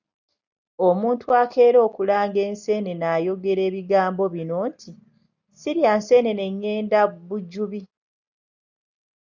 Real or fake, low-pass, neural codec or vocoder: real; 7.2 kHz; none